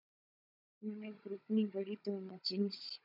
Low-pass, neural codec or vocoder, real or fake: 5.4 kHz; codec, 16 kHz, 16 kbps, FunCodec, trained on Chinese and English, 50 frames a second; fake